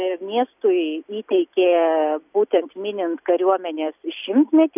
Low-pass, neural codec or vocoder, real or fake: 3.6 kHz; none; real